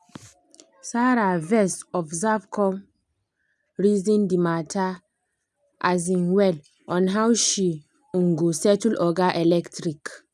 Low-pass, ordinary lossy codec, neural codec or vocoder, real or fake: none; none; none; real